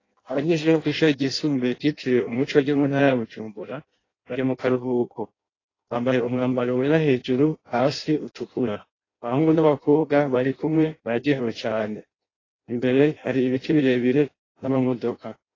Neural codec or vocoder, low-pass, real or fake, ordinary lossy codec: codec, 16 kHz in and 24 kHz out, 0.6 kbps, FireRedTTS-2 codec; 7.2 kHz; fake; AAC, 32 kbps